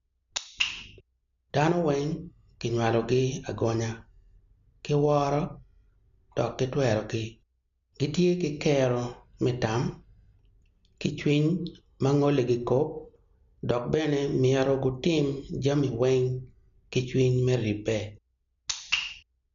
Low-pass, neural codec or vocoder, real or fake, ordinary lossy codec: 7.2 kHz; none; real; MP3, 96 kbps